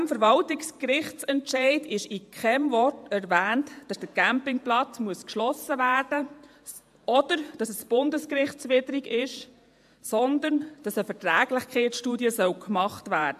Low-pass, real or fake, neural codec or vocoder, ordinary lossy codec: 14.4 kHz; fake; vocoder, 48 kHz, 128 mel bands, Vocos; AAC, 96 kbps